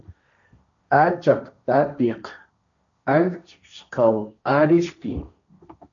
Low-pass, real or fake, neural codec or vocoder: 7.2 kHz; fake; codec, 16 kHz, 1.1 kbps, Voila-Tokenizer